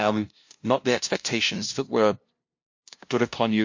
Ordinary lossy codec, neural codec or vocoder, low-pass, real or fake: MP3, 48 kbps; codec, 16 kHz, 0.5 kbps, FunCodec, trained on LibriTTS, 25 frames a second; 7.2 kHz; fake